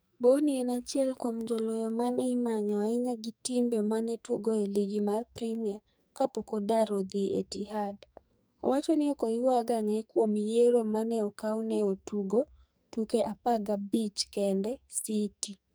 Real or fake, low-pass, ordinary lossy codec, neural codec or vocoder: fake; none; none; codec, 44.1 kHz, 2.6 kbps, SNAC